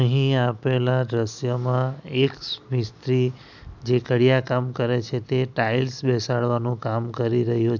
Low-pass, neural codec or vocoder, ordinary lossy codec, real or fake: 7.2 kHz; none; none; real